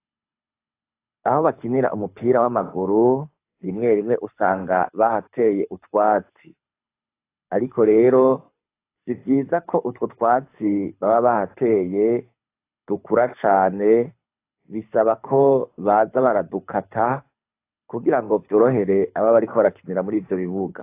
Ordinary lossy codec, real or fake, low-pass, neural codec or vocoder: AAC, 24 kbps; fake; 3.6 kHz; codec, 24 kHz, 6 kbps, HILCodec